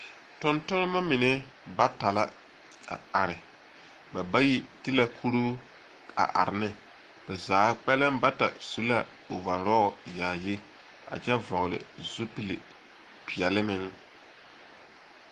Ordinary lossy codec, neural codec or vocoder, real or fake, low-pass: Opus, 24 kbps; codec, 44.1 kHz, 7.8 kbps, Pupu-Codec; fake; 14.4 kHz